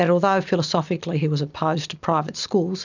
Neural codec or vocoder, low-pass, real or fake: none; 7.2 kHz; real